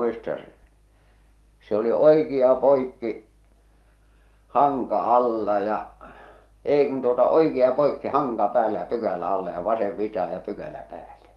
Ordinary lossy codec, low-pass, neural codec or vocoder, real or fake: Opus, 32 kbps; 19.8 kHz; codec, 44.1 kHz, 7.8 kbps, DAC; fake